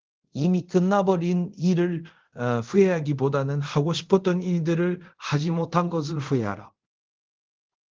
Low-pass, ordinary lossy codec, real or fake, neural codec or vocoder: 7.2 kHz; Opus, 16 kbps; fake; codec, 24 kHz, 0.5 kbps, DualCodec